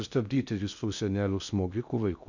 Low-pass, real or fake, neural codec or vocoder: 7.2 kHz; fake; codec, 16 kHz in and 24 kHz out, 0.6 kbps, FocalCodec, streaming, 2048 codes